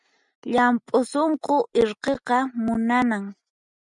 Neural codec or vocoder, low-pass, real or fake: none; 10.8 kHz; real